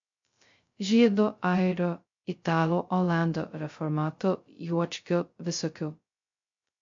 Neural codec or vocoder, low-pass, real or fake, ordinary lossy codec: codec, 16 kHz, 0.2 kbps, FocalCodec; 7.2 kHz; fake; MP3, 48 kbps